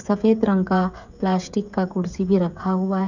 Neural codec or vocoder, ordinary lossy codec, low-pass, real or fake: codec, 16 kHz, 16 kbps, FreqCodec, smaller model; none; 7.2 kHz; fake